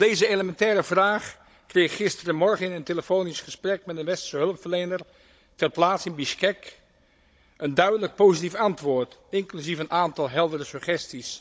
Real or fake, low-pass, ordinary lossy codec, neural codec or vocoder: fake; none; none; codec, 16 kHz, 16 kbps, FunCodec, trained on Chinese and English, 50 frames a second